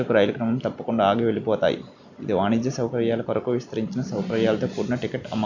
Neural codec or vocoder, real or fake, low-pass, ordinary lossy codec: none; real; 7.2 kHz; none